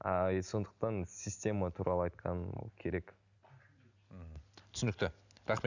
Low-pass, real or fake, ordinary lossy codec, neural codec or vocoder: 7.2 kHz; real; none; none